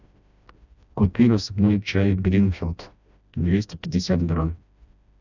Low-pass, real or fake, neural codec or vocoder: 7.2 kHz; fake; codec, 16 kHz, 1 kbps, FreqCodec, smaller model